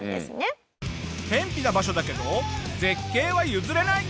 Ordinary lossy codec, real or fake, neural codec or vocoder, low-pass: none; real; none; none